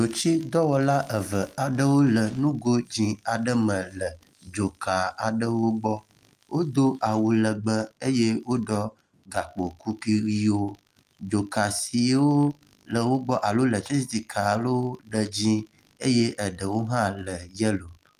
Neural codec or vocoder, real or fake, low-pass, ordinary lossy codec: none; real; 14.4 kHz; Opus, 32 kbps